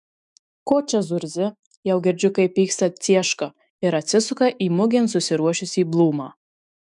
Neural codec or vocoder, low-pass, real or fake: autoencoder, 48 kHz, 128 numbers a frame, DAC-VAE, trained on Japanese speech; 10.8 kHz; fake